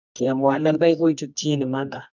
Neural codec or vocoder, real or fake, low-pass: codec, 24 kHz, 0.9 kbps, WavTokenizer, medium music audio release; fake; 7.2 kHz